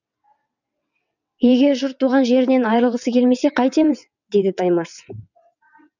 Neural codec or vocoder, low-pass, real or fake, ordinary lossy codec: vocoder, 22.05 kHz, 80 mel bands, WaveNeXt; 7.2 kHz; fake; none